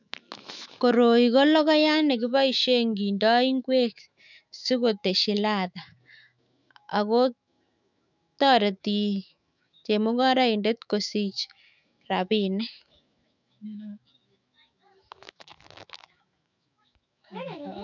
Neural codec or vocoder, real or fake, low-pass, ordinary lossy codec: autoencoder, 48 kHz, 128 numbers a frame, DAC-VAE, trained on Japanese speech; fake; 7.2 kHz; none